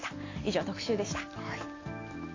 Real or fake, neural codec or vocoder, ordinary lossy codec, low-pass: real; none; AAC, 32 kbps; 7.2 kHz